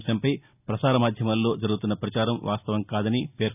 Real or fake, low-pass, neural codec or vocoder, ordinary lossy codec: real; 3.6 kHz; none; none